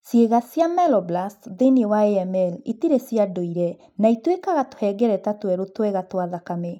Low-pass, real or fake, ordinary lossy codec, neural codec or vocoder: 19.8 kHz; real; none; none